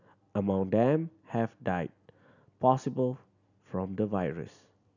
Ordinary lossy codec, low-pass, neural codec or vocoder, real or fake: none; 7.2 kHz; none; real